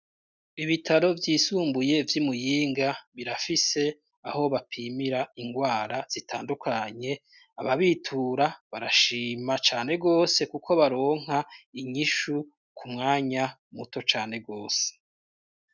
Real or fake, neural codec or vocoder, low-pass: real; none; 7.2 kHz